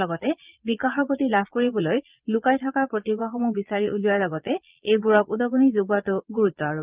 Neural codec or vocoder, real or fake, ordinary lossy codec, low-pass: vocoder, 22.05 kHz, 80 mel bands, Vocos; fake; Opus, 32 kbps; 3.6 kHz